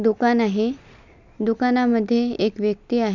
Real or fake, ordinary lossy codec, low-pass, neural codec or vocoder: real; none; 7.2 kHz; none